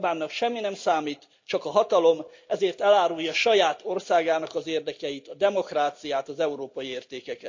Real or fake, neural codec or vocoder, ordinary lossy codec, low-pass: real; none; MP3, 64 kbps; 7.2 kHz